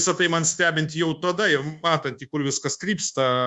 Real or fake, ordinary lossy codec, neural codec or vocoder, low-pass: fake; Opus, 64 kbps; codec, 24 kHz, 1.2 kbps, DualCodec; 10.8 kHz